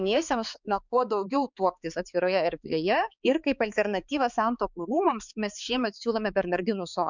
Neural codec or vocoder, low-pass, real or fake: codec, 16 kHz, 4 kbps, X-Codec, HuBERT features, trained on balanced general audio; 7.2 kHz; fake